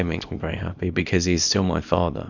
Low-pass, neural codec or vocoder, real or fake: 7.2 kHz; codec, 24 kHz, 0.9 kbps, WavTokenizer, small release; fake